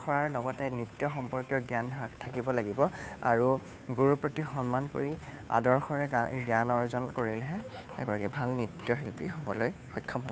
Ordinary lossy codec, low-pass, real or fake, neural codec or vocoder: none; none; fake; codec, 16 kHz, 2 kbps, FunCodec, trained on Chinese and English, 25 frames a second